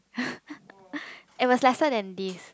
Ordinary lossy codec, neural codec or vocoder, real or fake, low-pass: none; none; real; none